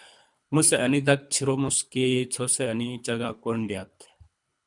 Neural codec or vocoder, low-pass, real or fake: codec, 24 kHz, 3 kbps, HILCodec; 10.8 kHz; fake